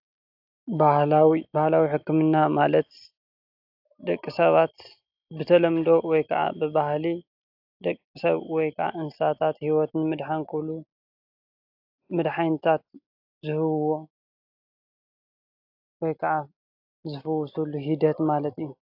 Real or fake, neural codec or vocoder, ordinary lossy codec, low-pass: real; none; AAC, 48 kbps; 5.4 kHz